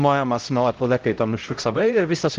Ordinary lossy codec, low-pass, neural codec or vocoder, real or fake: Opus, 16 kbps; 7.2 kHz; codec, 16 kHz, 0.5 kbps, X-Codec, HuBERT features, trained on LibriSpeech; fake